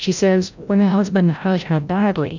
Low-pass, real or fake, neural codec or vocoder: 7.2 kHz; fake; codec, 16 kHz, 0.5 kbps, FreqCodec, larger model